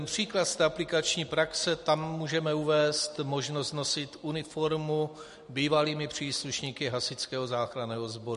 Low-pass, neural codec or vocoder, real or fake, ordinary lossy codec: 14.4 kHz; none; real; MP3, 48 kbps